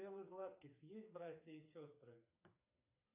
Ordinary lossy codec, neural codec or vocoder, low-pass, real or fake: AAC, 32 kbps; codec, 16 kHz, 4 kbps, FreqCodec, smaller model; 3.6 kHz; fake